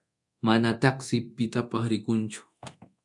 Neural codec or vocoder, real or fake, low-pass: codec, 24 kHz, 0.9 kbps, DualCodec; fake; 10.8 kHz